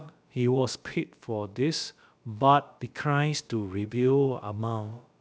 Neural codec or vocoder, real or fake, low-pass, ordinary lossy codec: codec, 16 kHz, about 1 kbps, DyCAST, with the encoder's durations; fake; none; none